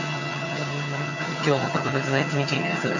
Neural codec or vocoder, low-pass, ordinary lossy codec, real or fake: vocoder, 22.05 kHz, 80 mel bands, HiFi-GAN; 7.2 kHz; none; fake